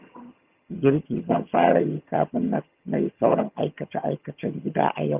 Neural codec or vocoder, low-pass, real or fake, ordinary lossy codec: vocoder, 22.05 kHz, 80 mel bands, HiFi-GAN; 3.6 kHz; fake; Opus, 32 kbps